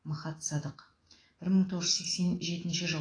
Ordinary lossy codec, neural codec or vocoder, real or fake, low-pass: AAC, 32 kbps; autoencoder, 48 kHz, 128 numbers a frame, DAC-VAE, trained on Japanese speech; fake; 9.9 kHz